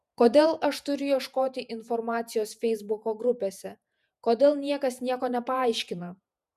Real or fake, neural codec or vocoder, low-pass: fake; vocoder, 48 kHz, 128 mel bands, Vocos; 14.4 kHz